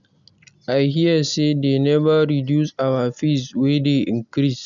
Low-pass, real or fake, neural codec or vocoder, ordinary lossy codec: 7.2 kHz; real; none; none